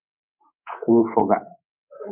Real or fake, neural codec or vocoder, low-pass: fake; codec, 24 kHz, 3.1 kbps, DualCodec; 3.6 kHz